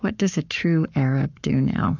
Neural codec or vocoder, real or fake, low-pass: codec, 44.1 kHz, 7.8 kbps, Pupu-Codec; fake; 7.2 kHz